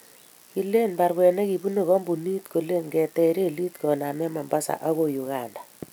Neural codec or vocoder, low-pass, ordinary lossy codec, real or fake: none; none; none; real